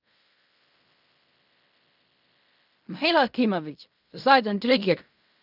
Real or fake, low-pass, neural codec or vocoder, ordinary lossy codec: fake; 5.4 kHz; codec, 16 kHz in and 24 kHz out, 0.4 kbps, LongCat-Audio-Codec, fine tuned four codebook decoder; none